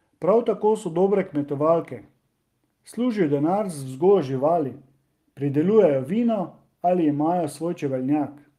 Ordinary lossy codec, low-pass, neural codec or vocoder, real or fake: Opus, 24 kbps; 14.4 kHz; none; real